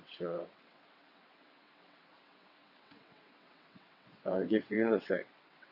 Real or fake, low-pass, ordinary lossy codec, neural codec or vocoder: fake; 5.4 kHz; none; vocoder, 22.05 kHz, 80 mel bands, WaveNeXt